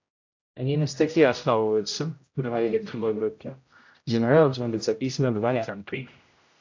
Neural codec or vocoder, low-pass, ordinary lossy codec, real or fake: codec, 16 kHz, 0.5 kbps, X-Codec, HuBERT features, trained on general audio; 7.2 kHz; AAC, 48 kbps; fake